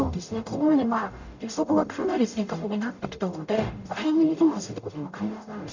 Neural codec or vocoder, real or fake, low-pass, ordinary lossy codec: codec, 44.1 kHz, 0.9 kbps, DAC; fake; 7.2 kHz; none